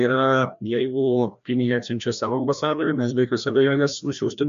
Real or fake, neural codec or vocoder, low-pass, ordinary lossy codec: fake; codec, 16 kHz, 1 kbps, FreqCodec, larger model; 7.2 kHz; MP3, 64 kbps